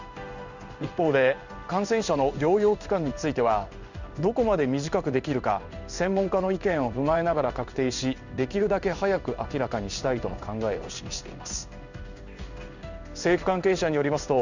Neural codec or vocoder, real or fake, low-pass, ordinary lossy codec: codec, 16 kHz in and 24 kHz out, 1 kbps, XY-Tokenizer; fake; 7.2 kHz; none